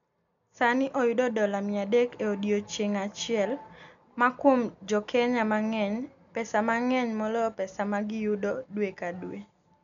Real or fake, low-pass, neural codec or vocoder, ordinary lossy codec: real; 7.2 kHz; none; MP3, 96 kbps